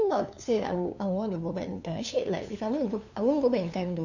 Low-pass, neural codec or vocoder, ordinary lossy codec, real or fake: 7.2 kHz; codec, 16 kHz, 2 kbps, FunCodec, trained on LibriTTS, 25 frames a second; none; fake